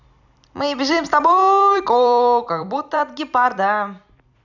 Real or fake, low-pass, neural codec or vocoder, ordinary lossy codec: fake; 7.2 kHz; vocoder, 44.1 kHz, 128 mel bands every 256 samples, BigVGAN v2; none